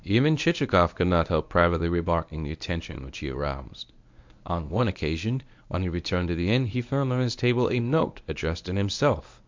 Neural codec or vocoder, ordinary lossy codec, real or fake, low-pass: codec, 24 kHz, 0.9 kbps, WavTokenizer, medium speech release version 1; MP3, 64 kbps; fake; 7.2 kHz